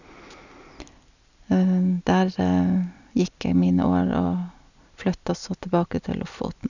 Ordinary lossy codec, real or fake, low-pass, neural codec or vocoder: none; real; 7.2 kHz; none